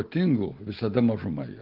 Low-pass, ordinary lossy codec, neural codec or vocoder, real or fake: 5.4 kHz; Opus, 16 kbps; none; real